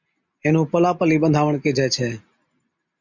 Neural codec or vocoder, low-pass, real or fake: none; 7.2 kHz; real